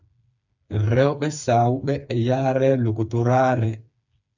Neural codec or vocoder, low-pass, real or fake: codec, 16 kHz, 4 kbps, FreqCodec, smaller model; 7.2 kHz; fake